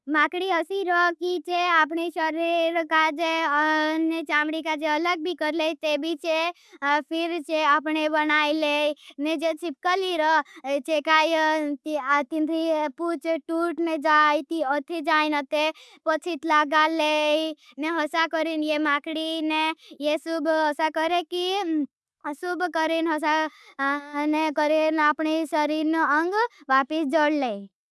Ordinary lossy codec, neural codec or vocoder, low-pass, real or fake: none; none; none; real